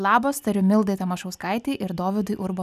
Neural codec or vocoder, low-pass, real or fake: none; 14.4 kHz; real